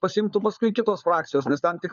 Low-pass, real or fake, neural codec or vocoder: 7.2 kHz; fake; codec, 16 kHz, 4 kbps, FunCodec, trained on LibriTTS, 50 frames a second